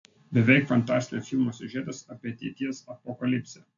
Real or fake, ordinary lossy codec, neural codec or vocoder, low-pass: real; AAC, 64 kbps; none; 7.2 kHz